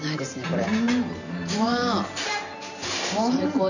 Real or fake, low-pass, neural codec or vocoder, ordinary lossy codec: fake; 7.2 kHz; vocoder, 44.1 kHz, 128 mel bands every 512 samples, BigVGAN v2; none